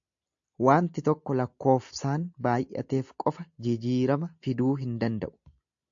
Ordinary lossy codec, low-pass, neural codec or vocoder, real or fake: AAC, 64 kbps; 7.2 kHz; none; real